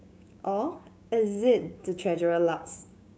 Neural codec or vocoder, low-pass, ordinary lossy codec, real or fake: codec, 16 kHz, 6 kbps, DAC; none; none; fake